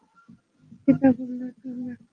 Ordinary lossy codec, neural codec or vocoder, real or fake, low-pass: Opus, 32 kbps; none; real; 9.9 kHz